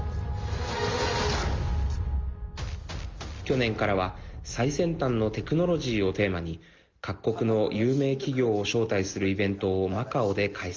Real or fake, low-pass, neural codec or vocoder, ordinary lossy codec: real; 7.2 kHz; none; Opus, 32 kbps